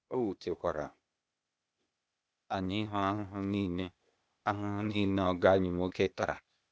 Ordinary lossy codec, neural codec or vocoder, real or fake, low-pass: none; codec, 16 kHz, 0.8 kbps, ZipCodec; fake; none